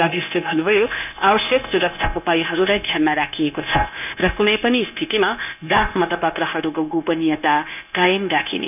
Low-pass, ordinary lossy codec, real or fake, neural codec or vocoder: 3.6 kHz; none; fake; codec, 16 kHz, 0.9 kbps, LongCat-Audio-Codec